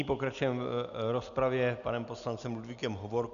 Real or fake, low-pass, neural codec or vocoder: real; 7.2 kHz; none